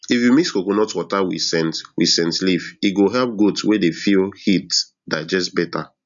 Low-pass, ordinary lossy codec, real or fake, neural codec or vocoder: 7.2 kHz; none; real; none